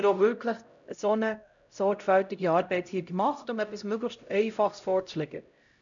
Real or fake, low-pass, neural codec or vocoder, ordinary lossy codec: fake; 7.2 kHz; codec, 16 kHz, 0.5 kbps, X-Codec, HuBERT features, trained on LibriSpeech; none